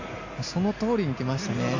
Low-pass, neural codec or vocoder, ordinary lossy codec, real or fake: 7.2 kHz; none; AAC, 48 kbps; real